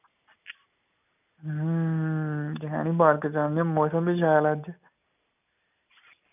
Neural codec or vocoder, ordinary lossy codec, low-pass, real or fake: none; none; 3.6 kHz; real